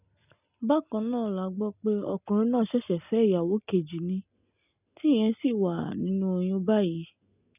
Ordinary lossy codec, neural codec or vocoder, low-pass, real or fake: none; none; 3.6 kHz; real